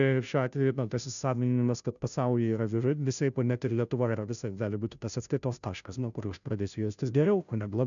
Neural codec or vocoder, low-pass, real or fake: codec, 16 kHz, 0.5 kbps, FunCodec, trained on Chinese and English, 25 frames a second; 7.2 kHz; fake